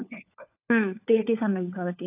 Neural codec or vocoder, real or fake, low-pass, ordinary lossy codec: codec, 16 kHz, 4 kbps, FunCodec, trained on LibriTTS, 50 frames a second; fake; 3.6 kHz; AAC, 24 kbps